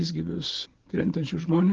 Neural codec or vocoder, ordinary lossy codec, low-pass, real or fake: none; Opus, 16 kbps; 7.2 kHz; real